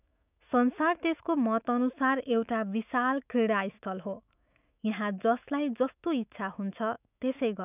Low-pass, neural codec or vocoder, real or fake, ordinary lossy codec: 3.6 kHz; autoencoder, 48 kHz, 128 numbers a frame, DAC-VAE, trained on Japanese speech; fake; none